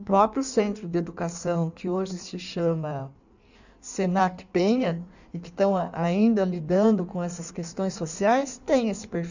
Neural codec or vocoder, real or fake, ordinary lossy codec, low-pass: codec, 16 kHz in and 24 kHz out, 1.1 kbps, FireRedTTS-2 codec; fake; none; 7.2 kHz